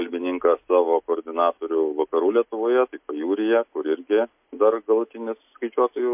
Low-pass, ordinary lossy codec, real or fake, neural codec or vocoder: 3.6 kHz; MP3, 32 kbps; real; none